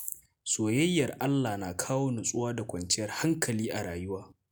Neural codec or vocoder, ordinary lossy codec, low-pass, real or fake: none; none; none; real